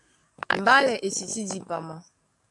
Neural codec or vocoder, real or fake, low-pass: codec, 44.1 kHz, 7.8 kbps, DAC; fake; 10.8 kHz